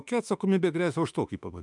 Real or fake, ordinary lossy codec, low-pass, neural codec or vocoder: fake; MP3, 96 kbps; 10.8 kHz; autoencoder, 48 kHz, 32 numbers a frame, DAC-VAE, trained on Japanese speech